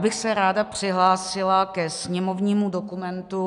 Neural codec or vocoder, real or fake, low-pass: none; real; 10.8 kHz